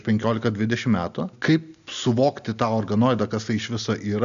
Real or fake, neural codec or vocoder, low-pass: real; none; 7.2 kHz